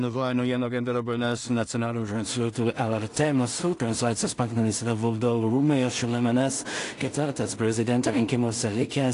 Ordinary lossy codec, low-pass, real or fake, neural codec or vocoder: AAC, 48 kbps; 10.8 kHz; fake; codec, 16 kHz in and 24 kHz out, 0.4 kbps, LongCat-Audio-Codec, two codebook decoder